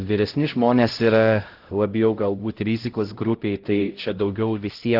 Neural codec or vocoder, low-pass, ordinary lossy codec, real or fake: codec, 16 kHz, 0.5 kbps, X-Codec, HuBERT features, trained on LibriSpeech; 5.4 kHz; Opus, 16 kbps; fake